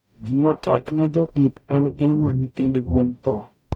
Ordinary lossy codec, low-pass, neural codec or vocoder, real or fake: none; 19.8 kHz; codec, 44.1 kHz, 0.9 kbps, DAC; fake